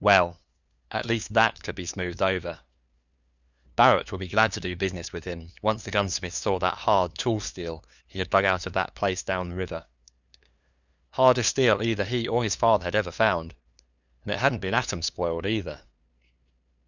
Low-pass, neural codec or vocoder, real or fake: 7.2 kHz; codec, 16 kHz, 2 kbps, FunCodec, trained on LibriTTS, 25 frames a second; fake